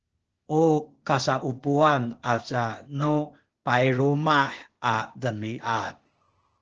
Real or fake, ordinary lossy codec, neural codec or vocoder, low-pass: fake; Opus, 16 kbps; codec, 16 kHz, 0.8 kbps, ZipCodec; 7.2 kHz